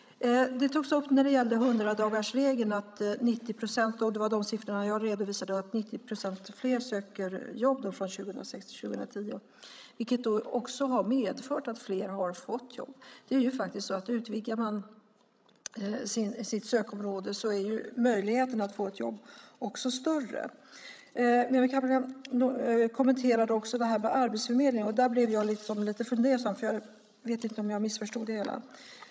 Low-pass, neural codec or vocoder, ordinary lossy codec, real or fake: none; codec, 16 kHz, 16 kbps, FreqCodec, larger model; none; fake